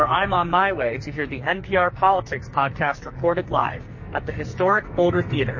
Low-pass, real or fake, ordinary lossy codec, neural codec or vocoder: 7.2 kHz; fake; MP3, 32 kbps; codec, 44.1 kHz, 2.6 kbps, SNAC